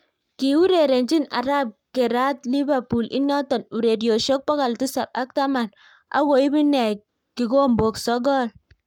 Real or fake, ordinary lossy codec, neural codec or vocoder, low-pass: fake; none; codec, 44.1 kHz, 7.8 kbps, Pupu-Codec; 19.8 kHz